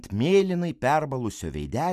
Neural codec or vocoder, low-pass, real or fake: none; 14.4 kHz; real